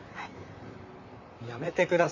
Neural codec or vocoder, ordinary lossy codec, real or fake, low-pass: vocoder, 44.1 kHz, 128 mel bands, Pupu-Vocoder; none; fake; 7.2 kHz